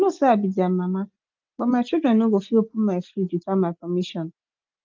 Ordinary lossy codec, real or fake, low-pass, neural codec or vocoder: Opus, 32 kbps; real; 7.2 kHz; none